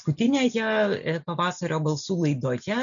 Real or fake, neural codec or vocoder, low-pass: real; none; 7.2 kHz